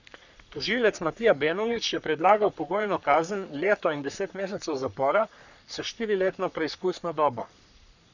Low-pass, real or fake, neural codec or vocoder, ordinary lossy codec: 7.2 kHz; fake; codec, 44.1 kHz, 3.4 kbps, Pupu-Codec; none